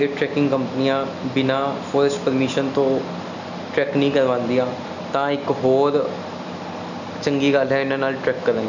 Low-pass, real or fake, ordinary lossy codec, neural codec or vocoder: 7.2 kHz; real; none; none